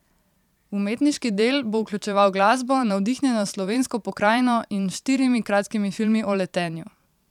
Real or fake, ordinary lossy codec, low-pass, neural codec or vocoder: fake; none; 19.8 kHz; vocoder, 44.1 kHz, 128 mel bands every 512 samples, BigVGAN v2